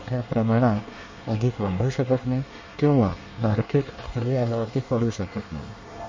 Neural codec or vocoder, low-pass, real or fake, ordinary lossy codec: codec, 24 kHz, 1 kbps, SNAC; 7.2 kHz; fake; MP3, 32 kbps